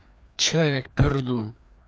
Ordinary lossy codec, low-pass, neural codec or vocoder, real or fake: none; none; codec, 16 kHz, 2 kbps, FreqCodec, larger model; fake